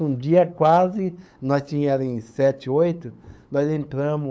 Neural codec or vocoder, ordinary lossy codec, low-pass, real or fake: codec, 16 kHz, 8 kbps, FunCodec, trained on LibriTTS, 25 frames a second; none; none; fake